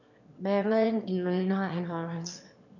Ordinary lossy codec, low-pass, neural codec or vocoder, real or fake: none; 7.2 kHz; autoencoder, 22.05 kHz, a latent of 192 numbers a frame, VITS, trained on one speaker; fake